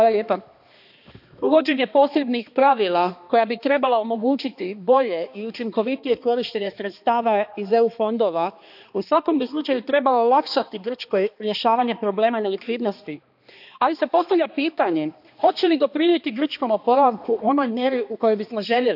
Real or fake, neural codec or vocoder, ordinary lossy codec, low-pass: fake; codec, 16 kHz, 2 kbps, X-Codec, HuBERT features, trained on balanced general audio; AAC, 48 kbps; 5.4 kHz